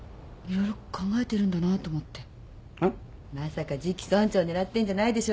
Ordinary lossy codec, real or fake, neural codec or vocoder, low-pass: none; real; none; none